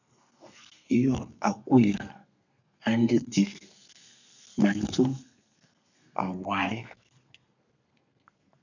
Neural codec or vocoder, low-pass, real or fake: codec, 32 kHz, 1.9 kbps, SNAC; 7.2 kHz; fake